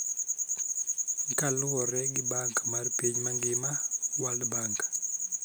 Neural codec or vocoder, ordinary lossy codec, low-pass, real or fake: none; none; none; real